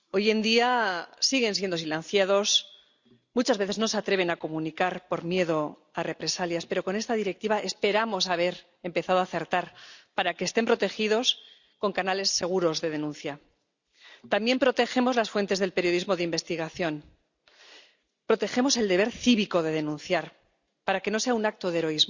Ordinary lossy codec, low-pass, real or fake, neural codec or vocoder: Opus, 64 kbps; 7.2 kHz; real; none